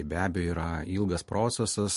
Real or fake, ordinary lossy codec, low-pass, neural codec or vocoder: real; MP3, 48 kbps; 14.4 kHz; none